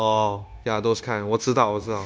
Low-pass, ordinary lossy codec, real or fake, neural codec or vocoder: none; none; fake; codec, 16 kHz, 0.9 kbps, LongCat-Audio-Codec